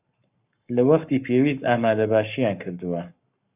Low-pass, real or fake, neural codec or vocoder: 3.6 kHz; fake; codec, 44.1 kHz, 7.8 kbps, Pupu-Codec